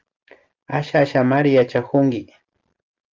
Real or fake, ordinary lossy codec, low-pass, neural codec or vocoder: real; Opus, 32 kbps; 7.2 kHz; none